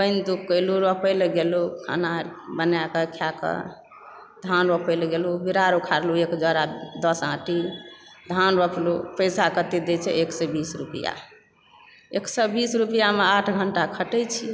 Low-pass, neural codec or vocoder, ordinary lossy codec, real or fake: none; none; none; real